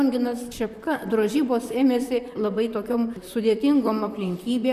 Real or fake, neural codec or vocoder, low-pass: fake; vocoder, 44.1 kHz, 128 mel bands, Pupu-Vocoder; 14.4 kHz